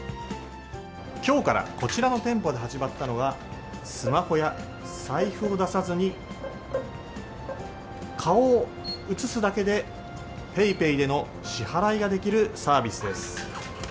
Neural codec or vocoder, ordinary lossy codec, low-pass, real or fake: none; none; none; real